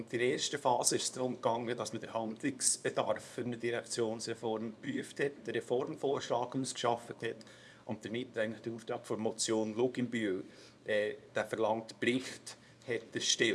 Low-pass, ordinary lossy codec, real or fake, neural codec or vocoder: none; none; fake; codec, 24 kHz, 0.9 kbps, WavTokenizer, small release